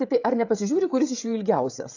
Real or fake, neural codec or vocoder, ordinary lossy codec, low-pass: fake; codec, 16 kHz, 16 kbps, FreqCodec, smaller model; AAC, 48 kbps; 7.2 kHz